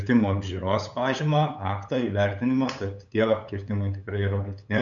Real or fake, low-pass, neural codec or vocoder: fake; 7.2 kHz; codec, 16 kHz, 8 kbps, FunCodec, trained on LibriTTS, 25 frames a second